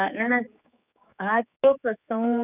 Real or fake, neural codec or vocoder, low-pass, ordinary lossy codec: fake; codec, 16 kHz, 4 kbps, X-Codec, HuBERT features, trained on general audio; 3.6 kHz; none